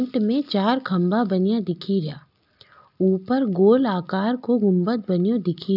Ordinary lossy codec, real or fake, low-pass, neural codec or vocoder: none; real; 5.4 kHz; none